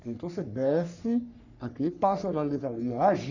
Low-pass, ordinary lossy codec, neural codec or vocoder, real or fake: 7.2 kHz; none; codec, 44.1 kHz, 3.4 kbps, Pupu-Codec; fake